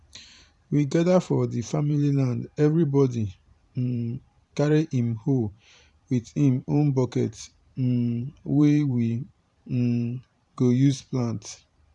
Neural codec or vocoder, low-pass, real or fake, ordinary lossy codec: vocoder, 44.1 kHz, 128 mel bands every 512 samples, BigVGAN v2; 10.8 kHz; fake; none